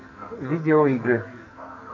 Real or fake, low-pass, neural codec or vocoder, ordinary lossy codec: fake; 7.2 kHz; codec, 44.1 kHz, 2.6 kbps, SNAC; MP3, 48 kbps